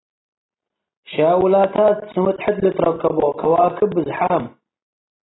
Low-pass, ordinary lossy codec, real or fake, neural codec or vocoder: 7.2 kHz; AAC, 16 kbps; real; none